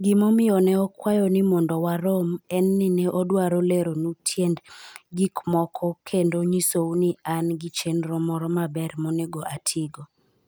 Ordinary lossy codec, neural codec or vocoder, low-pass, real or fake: none; none; none; real